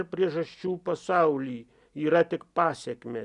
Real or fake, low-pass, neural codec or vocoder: real; 10.8 kHz; none